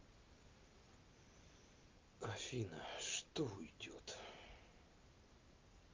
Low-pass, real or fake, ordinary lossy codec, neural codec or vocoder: 7.2 kHz; real; Opus, 24 kbps; none